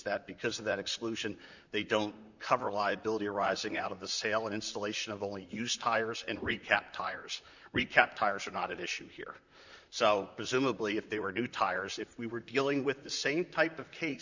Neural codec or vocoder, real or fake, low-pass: vocoder, 44.1 kHz, 128 mel bands, Pupu-Vocoder; fake; 7.2 kHz